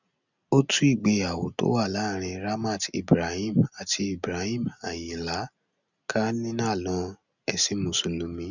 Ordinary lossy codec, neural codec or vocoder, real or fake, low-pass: none; none; real; 7.2 kHz